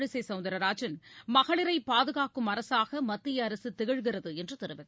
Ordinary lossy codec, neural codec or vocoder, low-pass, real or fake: none; none; none; real